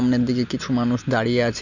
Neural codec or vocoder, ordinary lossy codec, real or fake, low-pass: none; none; real; 7.2 kHz